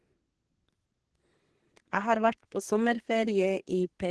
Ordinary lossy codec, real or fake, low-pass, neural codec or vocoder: Opus, 16 kbps; fake; 10.8 kHz; codec, 32 kHz, 1.9 kbps, SNAC